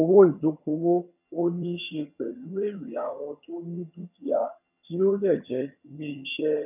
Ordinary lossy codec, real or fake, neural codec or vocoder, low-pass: none; fake; vocoder, 22.05 kHz, 80 mel bands, HiFi-GAN; 3.6 kHz